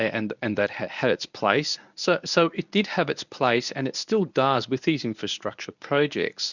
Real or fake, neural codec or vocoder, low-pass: fake; codec, 24 kHz, 0.9 kbps, WavTokenizer, medium speech release version 1; 7.2 kHz